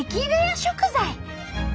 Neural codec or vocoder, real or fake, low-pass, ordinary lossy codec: none; real; none; none